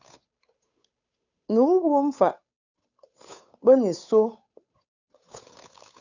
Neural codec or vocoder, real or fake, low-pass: codec, 16 kHz, 8 kbps, FunCodec, trained on Chinese and English, 25 frames a second; fake; 7.2 kHz